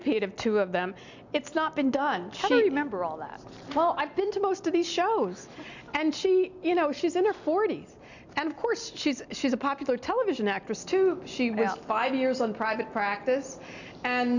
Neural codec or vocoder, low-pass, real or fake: none; 7.2 kHz; real